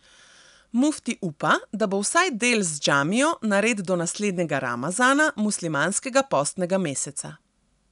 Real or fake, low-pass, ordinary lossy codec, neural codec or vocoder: real; 10.8 kHz; none; none